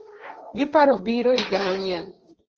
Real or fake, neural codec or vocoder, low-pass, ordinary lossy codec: fake; codec, 16 kHz, 1.1 kbps, Voila-Tokenizer; 7.2 kHz; Opus, 24 kbps